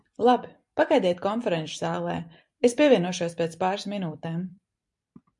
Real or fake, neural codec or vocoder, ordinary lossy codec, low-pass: fake; vocoder, 44.1 kHz, 128 mel bands every 256 samples, BigVGAN v2; MP3, 64 kbps; 10.8 kHz